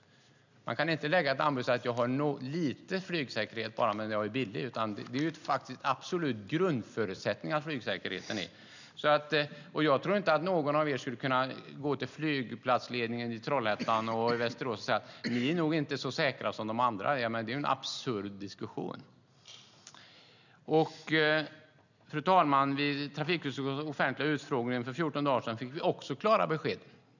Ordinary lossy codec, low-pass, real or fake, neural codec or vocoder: none; 7.2 kHz; real; none